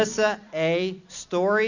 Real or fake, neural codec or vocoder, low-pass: fake; vocoder, 44.1 kHz, 128 mel bands every 256 samples, BigVGAN v2; 7.2 kHz